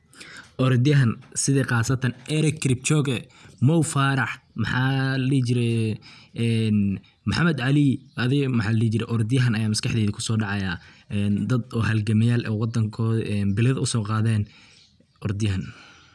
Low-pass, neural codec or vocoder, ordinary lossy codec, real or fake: none; none; none; real